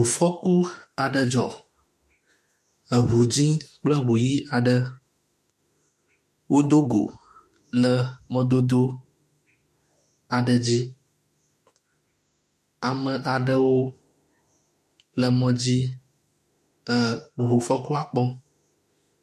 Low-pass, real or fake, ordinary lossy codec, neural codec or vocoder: 14.4 kHz; fake; MP3, 64 kbps; autoencoder, 48 kHz, 32 numbers a frame, DAC-VAE, trained on Japanese speech